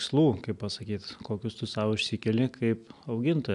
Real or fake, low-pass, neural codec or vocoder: real; 10.8 kHz; none